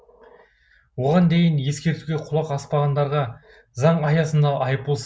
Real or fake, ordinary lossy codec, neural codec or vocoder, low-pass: real; none; none; none